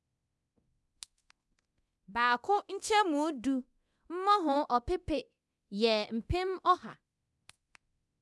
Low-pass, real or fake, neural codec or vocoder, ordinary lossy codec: none; fake; codec, 24 kHz, 0.9 kbps, DualCodec; none